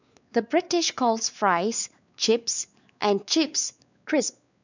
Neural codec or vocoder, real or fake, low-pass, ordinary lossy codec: codec, 16 kHz, 4 kbps, X-Codec, WavLM features, trained on Multilingual LibriSpeech; fake; 7.2 kHz; none